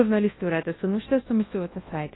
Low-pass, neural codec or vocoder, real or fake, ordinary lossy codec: 7.2 kHz; codec, 24 kHz, 0.9 kbps, WavTokenizer, large speech release; fake; AAC, 16 kbps